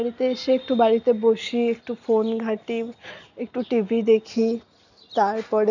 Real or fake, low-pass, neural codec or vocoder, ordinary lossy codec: real; 7.2 kHz; none; none